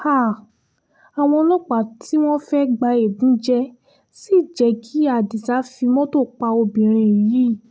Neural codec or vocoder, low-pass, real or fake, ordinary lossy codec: none; none; real; none